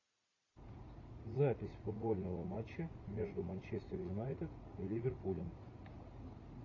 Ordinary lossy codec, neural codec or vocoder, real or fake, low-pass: AAC, 32 kbps; vocoder, 44.1 kHz, 80 mel bands, Vocos; fake; 7.2 kHz